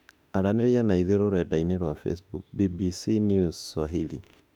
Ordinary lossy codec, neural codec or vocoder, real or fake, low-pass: none; autoencoder, 48 kHz, 32 numbers a frame, DAC-VAE, trained on Japanese speech; fake; 19.8 kHz